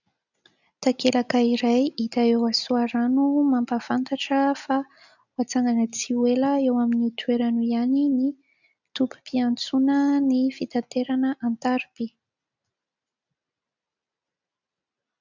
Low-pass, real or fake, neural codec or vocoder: 7.2 kHz; real; none